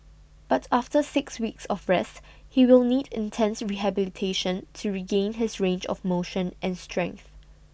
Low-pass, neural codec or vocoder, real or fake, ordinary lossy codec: none; none; real; none